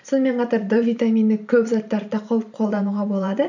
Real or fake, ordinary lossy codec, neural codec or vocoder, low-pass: fake; none; vocoder, 44.1 kHz, 128 mel bands every 512 samples, BigVGAN v2; 7.2 kHz